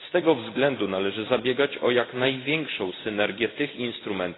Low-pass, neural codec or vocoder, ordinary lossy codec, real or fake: 7.2 kHz; none; AAC, 16 kbps; real